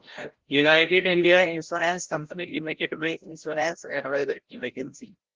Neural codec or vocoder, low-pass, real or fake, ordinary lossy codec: codec, 16 kHz, 0.5 kbps, FreqCodec, larger model; 7.2 kHz; fake; Opus, 16 kbps